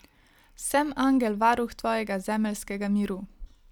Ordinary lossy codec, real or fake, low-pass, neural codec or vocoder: none; real; 19.8 kHz; none